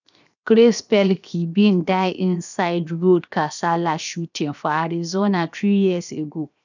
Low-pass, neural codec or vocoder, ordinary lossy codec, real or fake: 7.2 kHz; codec, 16 kHz, 0.7 kbps, FocalCodec; none; fake